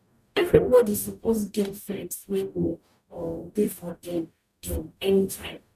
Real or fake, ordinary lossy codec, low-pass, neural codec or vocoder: fake; none; 14.4 kHz; codec, 44.1 kHz, 0.9 kbps, DAC